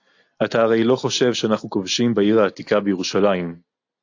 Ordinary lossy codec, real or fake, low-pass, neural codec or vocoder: AAC, 48 kbps; real; 7.2 kHz; none